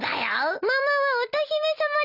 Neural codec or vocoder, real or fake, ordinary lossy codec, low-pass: none; real; none; 5.4 kHz